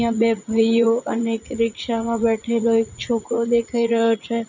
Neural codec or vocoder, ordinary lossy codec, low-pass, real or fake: vocoder, 44.1 kHz, 80 mel bands, Vocos; none; 7.2 kHz; fake